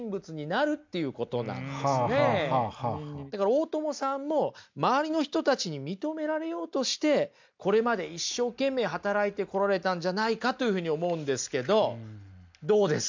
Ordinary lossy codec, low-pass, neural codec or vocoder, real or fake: MP3, 64 kbps; 7.2 kHz; none; real